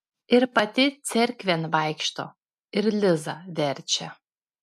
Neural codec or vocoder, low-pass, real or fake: none; 14.4 kHz; real